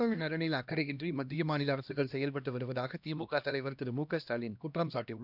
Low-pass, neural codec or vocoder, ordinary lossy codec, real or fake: 5.4 kHz; codec, 16 kHz, 1 kbps, X-Codec, HuBERT features, trained on LibriSpeech; none; fake